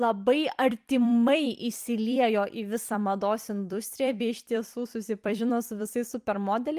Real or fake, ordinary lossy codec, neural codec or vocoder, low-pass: fake; Opus, 32 kbps; vocoder, 44.1 kHz, 128 mel bands every 256 samples, BigVGAN v2; 14.4 kHz